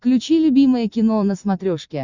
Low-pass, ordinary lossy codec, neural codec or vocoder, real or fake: 7.2 kHz; Opus, 64 kbps; none; real